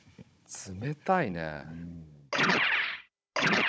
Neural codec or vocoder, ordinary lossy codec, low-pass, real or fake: codec, 16 kHz, 16 kbps, FunCodec, trained on Chinese and English, 50 frames a second; none; none; fake